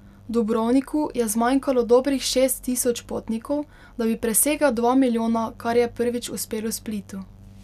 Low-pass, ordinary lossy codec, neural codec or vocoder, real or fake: 14.4 kHz; none; none; real